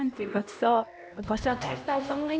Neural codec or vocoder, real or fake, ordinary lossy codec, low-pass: codec, 16 kHz, 1 kbps, X-Codec, HuBERT features, trained on LibriSpeech; fake; none; none